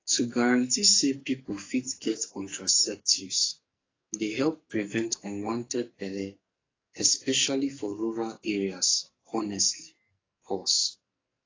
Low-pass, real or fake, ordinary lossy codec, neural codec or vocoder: 7.2 kHz; fake; AAC, 32 kbps; codec, 44.1 kHz, 2.6 kbps, SNAC